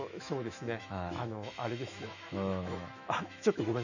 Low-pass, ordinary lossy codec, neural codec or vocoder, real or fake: 7.2 kHz; none; autoencoder, 48 kHz, 128 numbers a frame, DAC-VAE, trained on Japanese speech; fake